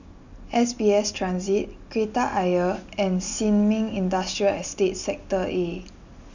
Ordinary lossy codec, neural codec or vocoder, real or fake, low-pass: none; none; real; 7.2 kHz